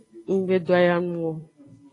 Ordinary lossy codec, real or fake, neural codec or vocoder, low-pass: AAC, 32 kbps; real; none; 10.8 kHz